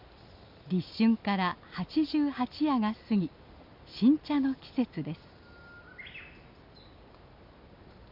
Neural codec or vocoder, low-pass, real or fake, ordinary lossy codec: none; 5.4 kHz; real; MP3, 48 kbps